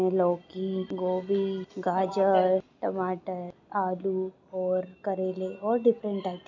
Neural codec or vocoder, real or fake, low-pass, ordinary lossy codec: none; real; 7.2 kHz; none